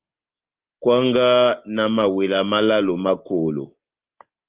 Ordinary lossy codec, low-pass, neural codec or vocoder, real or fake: Opus, 32 kbps; 3.6 kHz; none; real